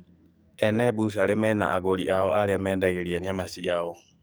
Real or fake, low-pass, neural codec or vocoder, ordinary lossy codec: fake; none; codec, 44.1 kHz, 2.6 kbps, SNAC; none